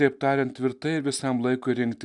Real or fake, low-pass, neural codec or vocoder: real; 10.8 kHz; none